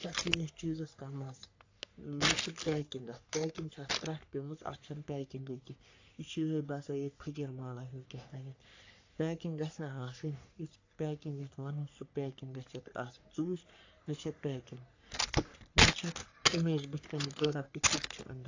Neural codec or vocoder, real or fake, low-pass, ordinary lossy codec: codec, 44.1 kHz, 3.4 kbps, Pupu-Codec; fake; 7.2 kHz; AAC, 48 kbps